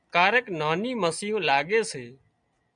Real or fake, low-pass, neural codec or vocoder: real; 9.9 kHz; none